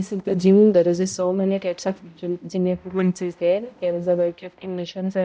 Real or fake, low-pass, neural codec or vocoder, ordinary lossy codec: fake; none; codec, 16 kHz, 0.5 kbps, X-Codec, HuBERT features, trained on balanced general audio; none